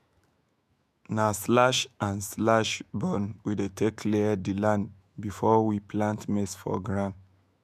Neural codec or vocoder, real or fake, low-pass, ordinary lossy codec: autoencoder, 48 kHz, 128 numbers a frame, DAC-VAE, trained on Japanese speech; fake; 14.4 kHz; MP3, 96 kbps